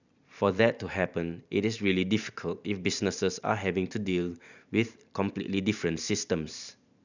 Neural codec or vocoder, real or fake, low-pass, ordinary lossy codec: vocoder, 44.1 kHz, 128 mel bands every 512 samples, BigVGAN v2; fake; 7.2 kHz; none